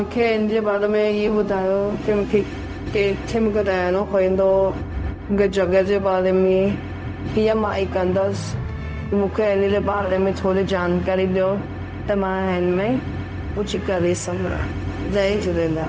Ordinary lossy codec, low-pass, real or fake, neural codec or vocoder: none; none; fake; codec, 16 kHz, 0.4 kbps, LongCat-Audio-Codec